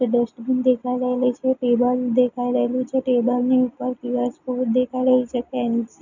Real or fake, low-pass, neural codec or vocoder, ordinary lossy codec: real; 7.2 kHz; none; none